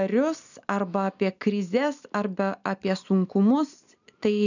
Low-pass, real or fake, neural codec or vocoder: 7.2 kHz; real; none